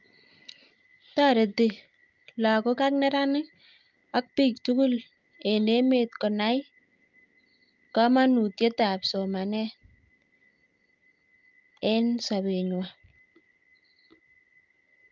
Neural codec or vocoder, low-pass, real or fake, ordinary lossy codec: none; 7.2 kHz; real; Opus, 24 kbps